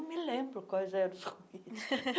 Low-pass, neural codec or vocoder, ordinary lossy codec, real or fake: none; none; none; real